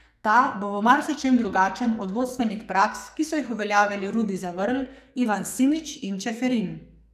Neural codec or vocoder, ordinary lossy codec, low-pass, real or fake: codec, 44.1 kHz, 2.6 kbps, SNAC; none; 14.4 kHz; fake